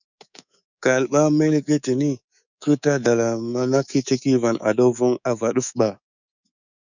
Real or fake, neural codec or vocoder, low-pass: fake; codec, 24 kHz, 3.1 kbps, DualCodec; 7.2 kHz